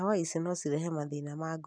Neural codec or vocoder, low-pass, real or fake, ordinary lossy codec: none; 9.9 kHz; real; none